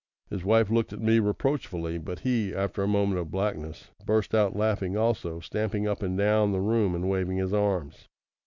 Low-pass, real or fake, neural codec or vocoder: 7.2 kHz; real; none